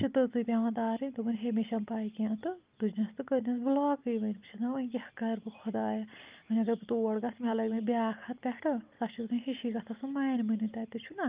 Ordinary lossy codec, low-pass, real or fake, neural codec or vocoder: Opus, 24 kbps; 3.6 kHz; real; none